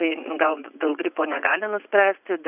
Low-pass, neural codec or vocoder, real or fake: 3.6 kHz; vocoder, 44.1 kHz, 80 mel bands, Vocos; fake